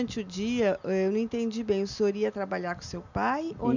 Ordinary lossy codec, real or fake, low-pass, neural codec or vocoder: none; real; 7.2 kHz; none